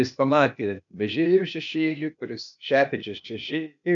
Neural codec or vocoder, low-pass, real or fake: codec, 16 kHz, 0.8 kbps, ZipCodec; 7.2 kHz; fake